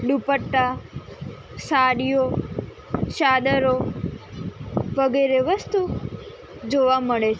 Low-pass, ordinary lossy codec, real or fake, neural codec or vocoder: none; none; real; none